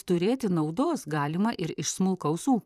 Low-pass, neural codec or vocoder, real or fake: 14.4 kHz; vocoder, 44.1 kHz, 128 mel bands, Pupu-Vocoder; fake